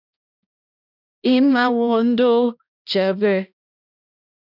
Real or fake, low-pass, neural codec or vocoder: fake; 5.4 kHz; codec, 16 kHz, 1 kbps, X-Codec, HuBERT features, trained on balanced general audio